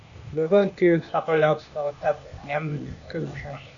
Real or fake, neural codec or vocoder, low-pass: fake; codec, 16 kHz, 0.8 kbps, ZipCodec; 7.2 kHz